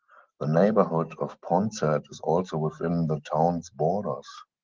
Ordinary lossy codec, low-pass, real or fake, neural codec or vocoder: Opus, 16 kbps; 7.2 kHz; real; none